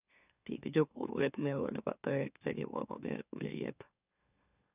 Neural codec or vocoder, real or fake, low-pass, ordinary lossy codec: autoencoder, 44.1 kHz, a latent of 192 numbers a frame, MeloTTS; fake; 3.6 kHz; none